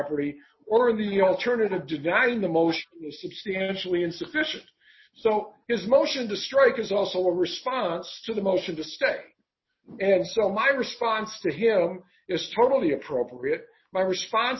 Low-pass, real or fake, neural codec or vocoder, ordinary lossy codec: 7.2 kHz; real; none; MP3, 24 kbps